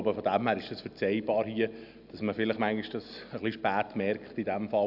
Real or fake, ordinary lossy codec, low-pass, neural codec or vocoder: real; none; 5.4 kHz; none